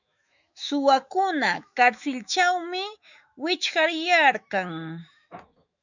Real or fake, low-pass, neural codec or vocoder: fake; 7.2 kHz; autoencoder, 48 kHz, 128 numbers a frame, DAC-VAE, trained on Japanese speech